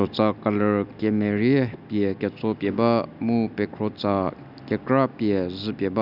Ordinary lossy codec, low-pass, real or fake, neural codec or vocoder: none; 5.4 kHz; real; none